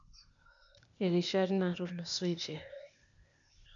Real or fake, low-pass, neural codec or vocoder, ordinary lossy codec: fake; 7.2 kHz; codec, 16 kHz, 0.8 kbps, ZipCodec; none